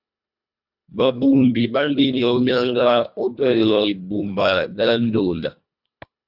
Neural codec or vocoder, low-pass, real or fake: codec, 24 kHz, 1.5 kbps, HILCodec; 5.4 kHz; fake